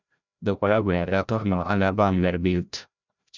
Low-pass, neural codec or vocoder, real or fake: 7.2 kHz; codec, 16 kHz, 0.5 kbps, FreqCodec, larger model; fake